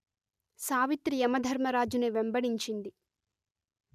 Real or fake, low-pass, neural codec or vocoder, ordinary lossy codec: real; 14.4 kHz; none; none